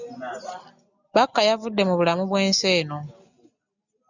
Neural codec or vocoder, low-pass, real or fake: none; 7.2 kHz; real